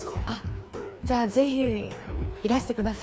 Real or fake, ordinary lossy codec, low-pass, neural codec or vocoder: fake; none; none; codec, 16 kHz, 2 kbps, FreqCodec, larger model